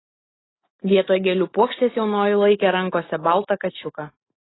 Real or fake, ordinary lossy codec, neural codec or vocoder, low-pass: real; AAC, 16 kbps; none; 7.2 kHz